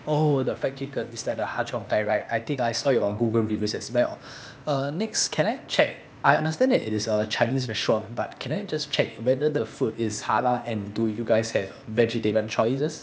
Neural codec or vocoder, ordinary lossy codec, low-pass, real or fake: codec, 16 kHz, 0.8 kbps, ZipCodec; none; none; fake